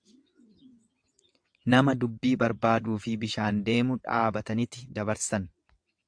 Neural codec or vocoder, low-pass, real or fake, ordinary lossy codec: vocoder, 22.05 kHz, 80 mel bands, WaveNeXt; 9.9 kHz; fake; AAC, 64 kbps